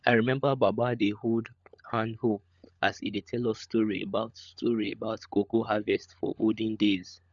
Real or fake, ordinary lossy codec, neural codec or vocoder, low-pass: fake; none; codec, 16 kHz, 16 kbps, FunCodec, trained on LibriTTS, 50 frames a second; 7.2 kHz